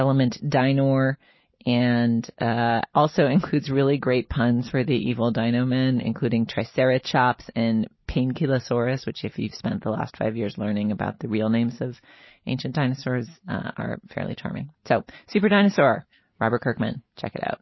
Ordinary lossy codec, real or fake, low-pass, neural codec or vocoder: MP3, 24 kbps; real; 7.2 kHz; none